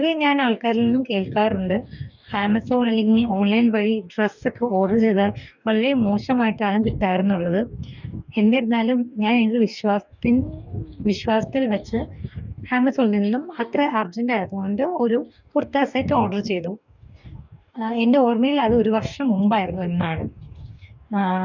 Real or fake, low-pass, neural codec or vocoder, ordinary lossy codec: fake; 7.2 kHz; codec, 44.1 kHz, 2.6 kbps, DAC; none